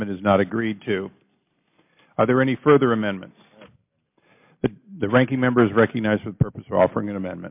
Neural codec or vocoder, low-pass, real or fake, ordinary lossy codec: none; 3.6 kHz; real; MP3, 32 kbps